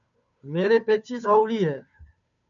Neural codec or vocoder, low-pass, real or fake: codec, 16 kHz, 2 kbps, FunCodec, trained on Chinese and English, 25 frames a second; 7.2 kHz; fake